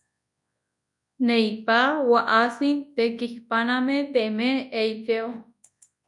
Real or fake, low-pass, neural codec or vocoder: fake; 10.8 kHz; codec, 24 kHz, 0.9 kbps, WavTokenizer, large speech release